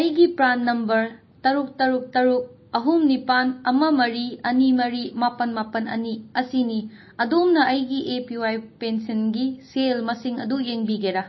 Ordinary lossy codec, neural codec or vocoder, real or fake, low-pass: MP3, 24 kbps; none; real; 7.2 kHz